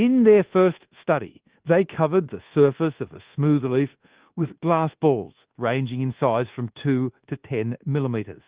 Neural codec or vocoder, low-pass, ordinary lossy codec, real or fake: codec, 24 kHz, 1.2 kbps, DualCodec; 3.6 kHz; Opus, 16 kbps; fake